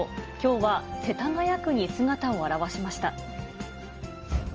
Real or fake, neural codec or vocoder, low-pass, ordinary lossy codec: real; none; 7.2 kHz; Opus, 24 kbps